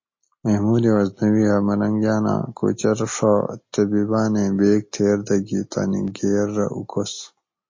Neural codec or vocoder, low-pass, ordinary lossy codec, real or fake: none; 7.2 kHz; MP3, 32 kbps; real